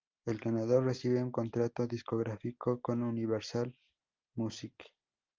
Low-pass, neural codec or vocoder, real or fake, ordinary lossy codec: 7.2 kHz; none; real; Opus, 32 kbps